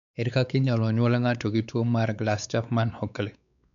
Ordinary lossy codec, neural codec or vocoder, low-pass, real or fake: none; codec, 16 kHz, 4 kbps, X-Codec, WavLM features, trained on Multilingual LibriSpeech; 7.2 kHz; fake